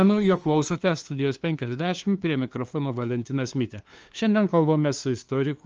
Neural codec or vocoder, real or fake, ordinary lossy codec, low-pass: codec, 16 kHz, 2 kbps, FunCodec, trained on LibriTTS, 25 frames a second; fake; Opus, 24 kbps; 7.2 kHz